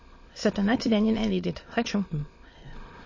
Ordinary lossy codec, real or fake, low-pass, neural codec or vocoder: MP3, 32 kbps; fake; 7.2 kHz; autoencoder, 22.05 kHz, a latent of 192 numbers a frame, VITS, trained on many speakers